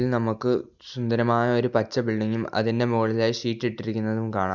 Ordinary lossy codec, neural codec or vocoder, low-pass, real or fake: none; none; 7.2 kHz; real